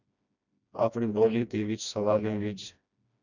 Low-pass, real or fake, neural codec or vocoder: 7.2 kHz; fake; codec, 16 kHz, 1 kbps, FreqCodec, smaller model